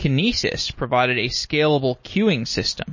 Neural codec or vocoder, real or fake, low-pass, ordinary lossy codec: none; real; 7.2 kHz; MP3, 32 kbps